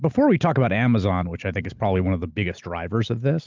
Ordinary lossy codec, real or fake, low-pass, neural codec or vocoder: Opus, 32 kbps; real; 7.2 kHz; none